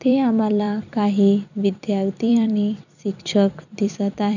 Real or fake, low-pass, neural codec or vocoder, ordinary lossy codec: fake; 7.2 kHz; vocoder, 44.1 kHz, 128 mel bands every 256 samples, BigVGAN v2; none